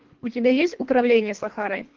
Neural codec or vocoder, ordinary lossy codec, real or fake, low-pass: codec, 24 kHz, 1.5 kbps, HILCodec; Opus, 16 kbps; fake; 7.2 kHz